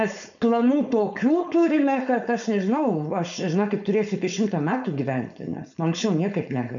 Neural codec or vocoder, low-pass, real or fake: codec, 16 kHz, 4.8 kbps, FACodec; 7.2 kHz; fake